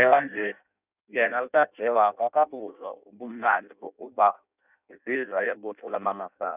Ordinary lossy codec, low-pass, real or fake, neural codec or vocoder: none; 3.6 kHz; fake; codec, 16 kHz in and 24 kHz out, 0.6 kbps, FireRedTTS-2 codec